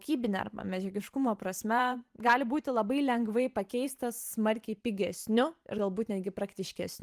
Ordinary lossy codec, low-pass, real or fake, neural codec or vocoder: Opus, 24 kbps; 14.4 kHz; fake; vocoder, 44.1 kHz, 128 mel bands every 512 samples, BigVGAN v2